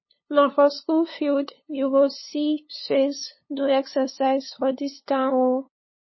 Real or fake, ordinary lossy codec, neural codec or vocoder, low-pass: fake; MP3, 24 kbps; codec, 16 kHz, 2 kbps, FunCodec, trained on LibriTTS, 25 frames a second; 7.2 kHz